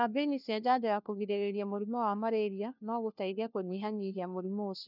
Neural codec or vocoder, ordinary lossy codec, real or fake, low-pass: codec, 16 kHz, 1 kbps, FunCodec, trained on LibriTTS, 50 frames a second; none; fake; 5.4 kHz